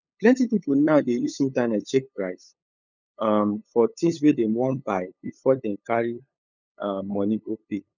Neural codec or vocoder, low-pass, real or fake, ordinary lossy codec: codec, 16 kHz, 8 kbps, FunCodec, trained on LibriTTS, 25 frames a second; 7.2 kHz; fake; none